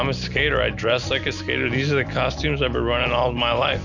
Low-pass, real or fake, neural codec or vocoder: 7.2 kHz; real; none